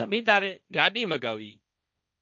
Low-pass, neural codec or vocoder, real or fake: 7.2 kHz; codec, 16 kHz, 1.1 kbps, Voila-Tokenizer; fake